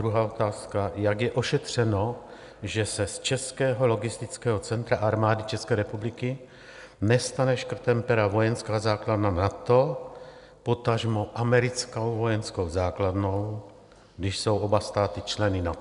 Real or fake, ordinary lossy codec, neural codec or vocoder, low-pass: real; MP3, 96 kbps; none; 10.8 kHz